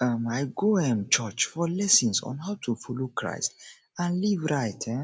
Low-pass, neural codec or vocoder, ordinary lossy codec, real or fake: none; none; none; real